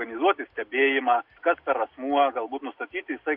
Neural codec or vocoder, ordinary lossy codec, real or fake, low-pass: none; AAC, 48 kbps; real; 5.4 kHz